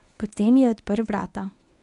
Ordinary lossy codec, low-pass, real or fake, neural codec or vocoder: none; 10.8 kHz; fake; codec, 24 kHz, 0.9 kbps, WavTokenizer, medium speech release version 1